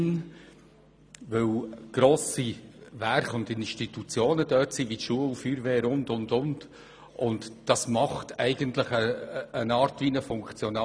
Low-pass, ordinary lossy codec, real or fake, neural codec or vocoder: none; none; real; none